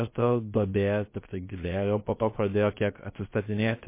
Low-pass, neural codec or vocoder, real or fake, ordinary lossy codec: 3.6 kHz; codec, 16 kHz, about 1 kbps, DyCAST, with the encoder's durations; fake; MP3, 24 kbps